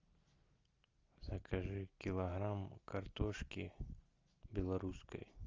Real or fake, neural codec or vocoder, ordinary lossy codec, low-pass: real; none; Opus, 32 kbps; 7.2 kHz